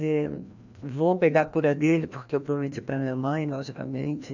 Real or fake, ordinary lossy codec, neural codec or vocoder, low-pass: fake; none; codec, 16 kHz, 1 kbps, FreqCodec, larger model; 7.2 kHz